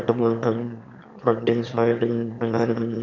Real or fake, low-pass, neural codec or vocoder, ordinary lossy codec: fake; 7.2 kHz; autoencoder, 22.05 kHz, a latent of 192 numbers a frame, VITS, trained on one speaker; none